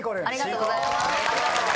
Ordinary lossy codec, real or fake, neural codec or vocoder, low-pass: none; real; none; none